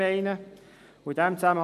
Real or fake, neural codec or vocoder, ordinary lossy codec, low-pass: real; none; none; 14.4 kHz